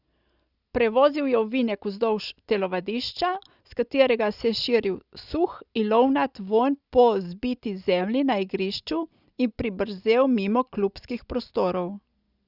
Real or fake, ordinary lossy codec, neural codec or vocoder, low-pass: real; Opus, 64 kbps; none; 5.4 kHz